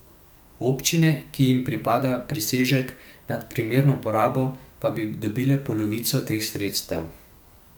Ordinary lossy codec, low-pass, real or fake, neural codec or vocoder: none; none; fake; codec, 44.1 kHz, 2.6 kbps, SNAC